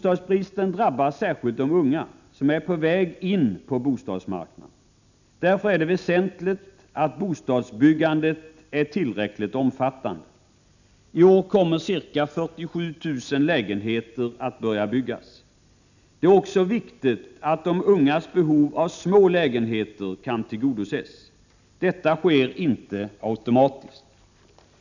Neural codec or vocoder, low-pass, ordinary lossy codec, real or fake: none; 7.2 kHz; none; real